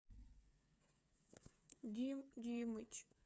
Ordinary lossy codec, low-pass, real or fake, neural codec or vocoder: none; none; fake; codec, 16 kHz, 8 kbps, FreqCodec, smaller model